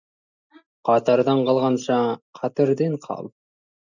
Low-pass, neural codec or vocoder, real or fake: 7.2 kHz; none; real